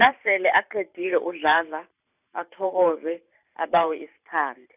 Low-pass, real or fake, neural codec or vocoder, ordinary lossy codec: 3.6 kHz; fake; vocoder, 44.1 kHz, 128 mel bands every 512 samples, BigVGAN v2; none